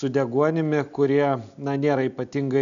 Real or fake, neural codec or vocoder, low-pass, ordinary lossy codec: real; none; 7.2 kHz; MP3, 96 kbps